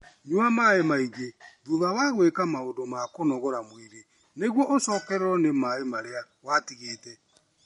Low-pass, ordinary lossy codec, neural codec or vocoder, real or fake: 19.8 kHz; MP3, 48 kbps; none; real